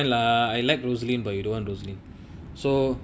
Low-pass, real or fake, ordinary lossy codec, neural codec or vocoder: none; real; none; none